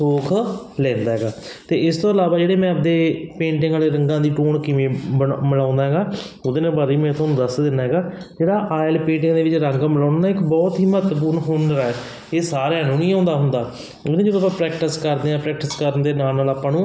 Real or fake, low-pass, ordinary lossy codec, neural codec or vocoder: real; none; none; none